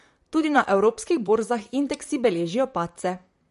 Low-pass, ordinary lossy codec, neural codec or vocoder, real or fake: 14.4 kHz; MP3, 48 kbps; vocoder, 44.1 kHz, 128 mel bands, Pupu-Vocoder; fake